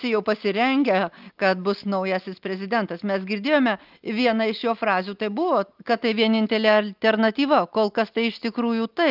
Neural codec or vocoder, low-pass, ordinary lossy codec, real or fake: none; 5.4 kHz; Opus, 24 kbps; real